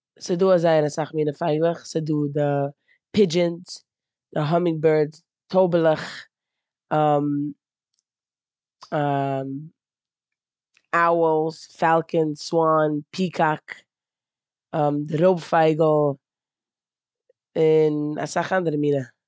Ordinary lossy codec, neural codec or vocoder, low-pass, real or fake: none; none; none; real